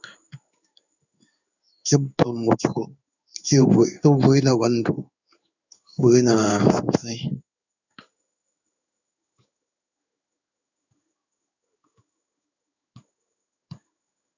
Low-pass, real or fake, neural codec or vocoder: 7.2 kHz; fake; codec, 16 kHz in and 24 kHz out, 1 kbps, XY-Tokenizer